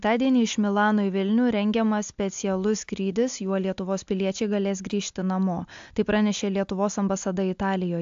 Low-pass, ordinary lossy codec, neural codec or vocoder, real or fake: 7.2 kHz; AAC, 64 kbps; none; real